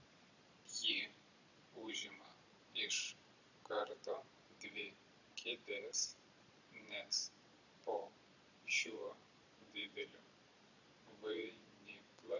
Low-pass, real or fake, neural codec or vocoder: 7.2 kHz; fake; vocoder, 22.05 kHz, 80 mel bands, Vocos